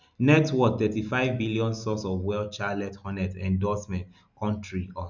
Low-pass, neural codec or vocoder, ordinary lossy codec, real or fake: 7.2 kHz; none; none; real